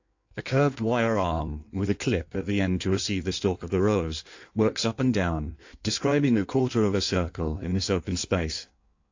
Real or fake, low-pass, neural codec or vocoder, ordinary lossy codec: fake; 7.2 kHz; codec, 16 kHz in and 24 kHz out, 1.1 kbps, FireRedTTS-2 codec; AAC, 48 kbps